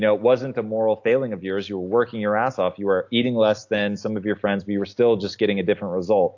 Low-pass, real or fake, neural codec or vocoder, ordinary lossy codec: 7.2 kHz; real; none; AAC, 48 kbps